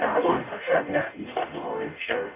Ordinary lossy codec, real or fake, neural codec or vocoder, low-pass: none; fake; codec, 44.1 kHz, 0.9 kbps, DAC; 3.6 kHz